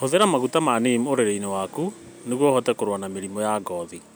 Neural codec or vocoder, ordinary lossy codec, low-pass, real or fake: none; none; none; real